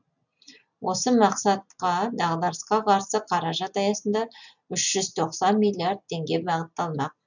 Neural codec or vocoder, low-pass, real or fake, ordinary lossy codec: none; 7.2 kHz; real; none